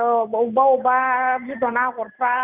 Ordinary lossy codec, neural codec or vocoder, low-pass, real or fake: none; none; 3.6 kHz; real